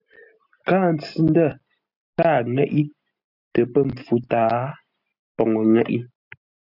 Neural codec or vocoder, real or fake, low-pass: none; real; 5.4 kHz